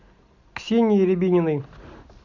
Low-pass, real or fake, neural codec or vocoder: 7.2 kHz; real; none